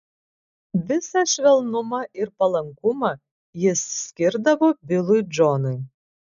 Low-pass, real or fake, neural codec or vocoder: 7.2 kHz; real; none